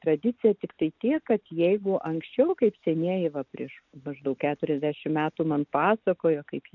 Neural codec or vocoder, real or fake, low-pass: none; real; 7.2 kHz